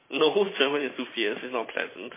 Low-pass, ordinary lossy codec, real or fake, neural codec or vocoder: 3.6 kHz; MP3, 16 kbps; fake; vocoder, 44.1 kHz, 128 mel bands every 512 samples, BigVGAN v2